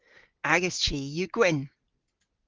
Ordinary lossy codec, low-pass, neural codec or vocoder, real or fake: Opus, 16 kbps; 7.2 kHz; none; real